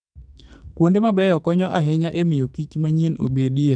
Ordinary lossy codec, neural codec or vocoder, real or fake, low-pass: MP3, 96 kbps; codec, 44.1 kHz, 2.6 kbps, SNAC; fake; 9.9 kHz